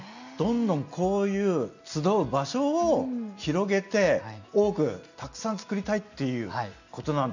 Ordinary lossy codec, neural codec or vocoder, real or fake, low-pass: none; none; real; 7.2 kHz